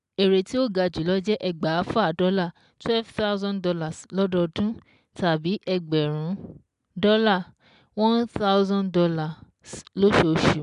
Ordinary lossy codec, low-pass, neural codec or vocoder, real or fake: MP3, 96 kbps; 10.8 kHz; none; real